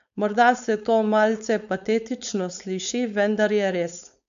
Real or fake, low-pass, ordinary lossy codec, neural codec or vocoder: fake; 7.2 kHz; none; codec, 16 kHz, 4.8 kbps, FACodec